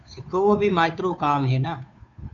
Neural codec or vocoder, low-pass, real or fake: codec, 16 kHz, 2 kbps, FunCodec, trained on Chinese and English, 25 frames a second; 7.2 kHz; fake